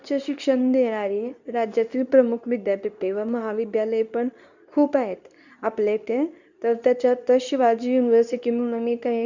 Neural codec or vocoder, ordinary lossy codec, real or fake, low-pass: codec, 24 kHz, 0.9 kbps, WavTokenizer, medium speech release version 2; none; fake; 7.2 kHz